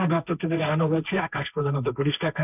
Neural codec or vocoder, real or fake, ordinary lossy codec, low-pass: codec, 16 kHz, 1.1 kbps, Voila-Tokenizer; fake; none; 3.6 kHz